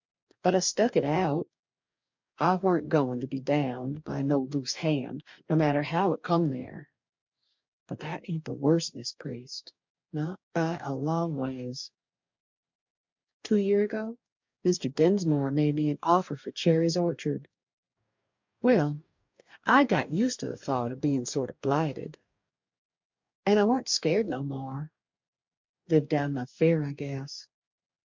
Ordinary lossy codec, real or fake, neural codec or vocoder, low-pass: MP3, 64 kbps; fake; codec, 44.1 kHz, 2.6 kbps, DAC; 7.2 kHz